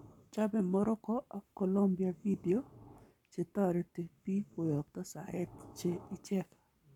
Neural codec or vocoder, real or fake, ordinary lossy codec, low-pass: codec, 44.1 kHz, 7.8 kbps, Pupu-Codec; fake; none; 19.8 kHz